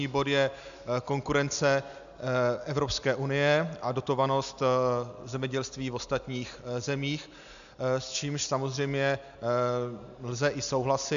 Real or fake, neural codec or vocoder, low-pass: real; none; 7.2 kHz